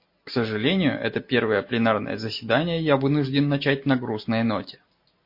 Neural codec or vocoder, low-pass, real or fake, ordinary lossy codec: none; 5.4 kHz; real; MP3, 32 kbps